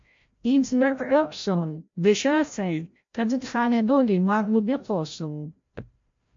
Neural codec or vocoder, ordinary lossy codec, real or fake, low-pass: codec, 16 kHz, 0.5 kbps, FreqCodec, larger model; MP3, 48 kbps; fake; 7.2 kHz